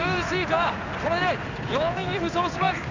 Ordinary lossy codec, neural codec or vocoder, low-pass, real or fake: none; codec, 16 kHz in and 24 kHz out, 1 kbps, XY-Tokenizer; 7.2 kHz; fake